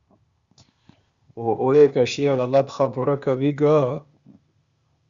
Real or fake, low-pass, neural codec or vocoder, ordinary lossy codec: fake; 7.2 kHz; codec, 16 kHz, 0.8 kbps, ZipCodec; Opus, 64 kbps